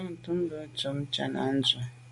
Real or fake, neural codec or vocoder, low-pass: real; none; 10.8 kHz